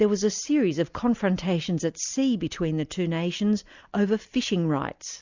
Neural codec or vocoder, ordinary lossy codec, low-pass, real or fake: none; Opus, 64 kbps; 7.2 kHz; real